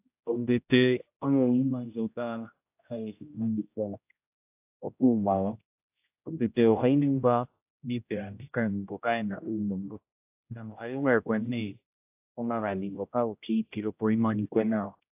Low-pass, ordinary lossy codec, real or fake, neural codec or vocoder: 3.6 kHz; AAC, 32 kbps; fake; codec, 16 kHz, 0.5 kbps, X-Codec, HuBERT features, trained on general audio